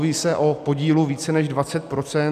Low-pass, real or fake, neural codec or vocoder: 14.4 kHz; real; none